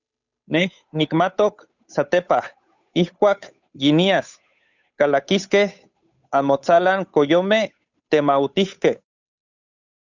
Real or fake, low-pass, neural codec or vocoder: fake; 7.2 kHz; codec, 16 kHz, 8 kbps, FunCodec, trained on Chinese and English, 25 frames a second